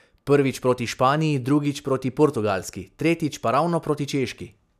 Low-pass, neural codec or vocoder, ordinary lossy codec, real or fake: 14.4 kHz; none; none; real